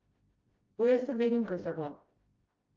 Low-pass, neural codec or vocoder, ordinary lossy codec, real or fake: 7.2 kHz; codec, 16 kHz, 0.5 kbps, FreqCodec, smaller model; Opus, 24 kbps; fake